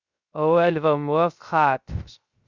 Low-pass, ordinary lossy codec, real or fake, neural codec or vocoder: 7.2 kHz; Opus, 64 kbps; fake; codec, 16 kHz, 0.3 kbps, FocalCodec